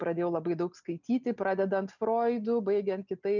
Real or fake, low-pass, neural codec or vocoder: real; 7.2 kHz; none